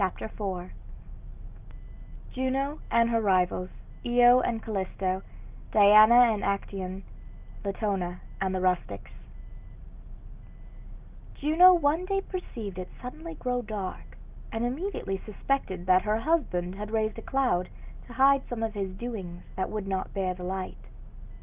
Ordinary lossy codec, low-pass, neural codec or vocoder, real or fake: Opus, 24 kbps; 3.6 kHz; none; real